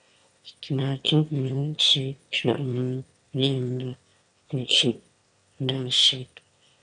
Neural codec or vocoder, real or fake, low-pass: autoencoder, 22.05 kHz, a latent of 192 numbers a frame, VITS, trained on one speaker; fake; 9.9 kHz